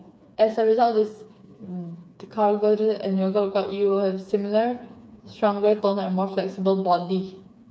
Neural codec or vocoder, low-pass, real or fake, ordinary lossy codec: codec, 16 kHz, 4 kbps, FreqCodec, smaller model; none; fake; none